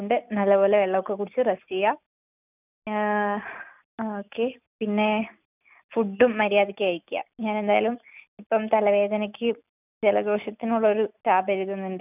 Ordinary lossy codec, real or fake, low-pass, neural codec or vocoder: none; real; 3.6 kHz; none